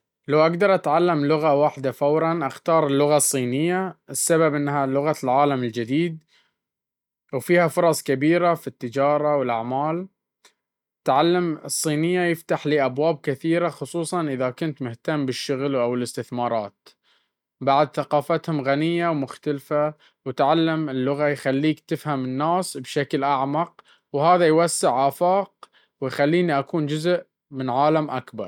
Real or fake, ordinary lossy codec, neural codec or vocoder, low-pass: real; none; none; 19.8 kHz